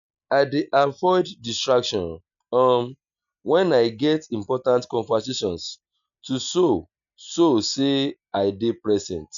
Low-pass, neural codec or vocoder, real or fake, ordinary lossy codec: 7.2 kHz; none; real; none